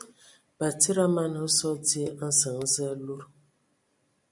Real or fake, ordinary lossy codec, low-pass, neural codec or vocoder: real; MP3, 96 kbps; 10.8 kHz; none